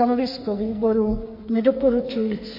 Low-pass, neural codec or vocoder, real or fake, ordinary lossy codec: 5.4 kHz; codec, 44.1 kHz, 2.6 kbps, SNAC; fake; MP3, 32 kbps